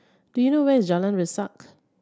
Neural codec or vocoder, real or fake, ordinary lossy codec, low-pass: none; real; none; none